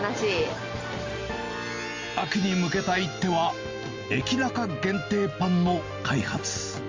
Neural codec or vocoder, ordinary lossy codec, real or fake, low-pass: none; Opus, 32 kbps; real; 7.2 kHz